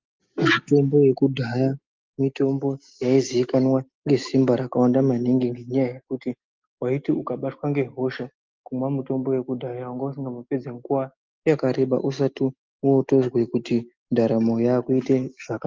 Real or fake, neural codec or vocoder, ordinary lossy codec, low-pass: real; none; Opus, 24 kbps; 7.2 kHz